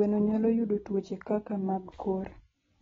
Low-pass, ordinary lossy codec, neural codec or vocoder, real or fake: 7.2 kHz; AAC, 24 kbps; none; real